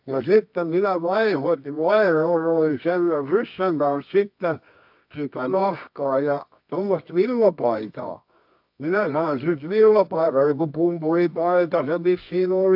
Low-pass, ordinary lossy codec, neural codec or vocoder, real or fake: 5.4 kHz; AAC, 48 kbps; codec, 24 kHz, 0.9 kbps, WavTokenizer, medium music audio release; fake